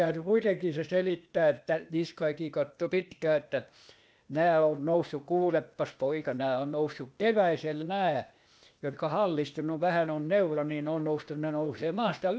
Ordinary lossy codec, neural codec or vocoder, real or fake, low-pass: none; codec, 16 kHz, 0.8 kbps, ZipCodec; fake; none